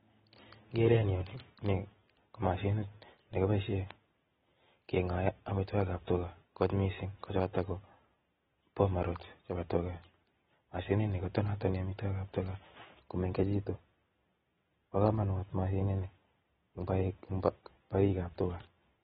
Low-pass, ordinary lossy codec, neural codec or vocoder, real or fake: 10.8 kHz; AAC, 16 kbps; none; real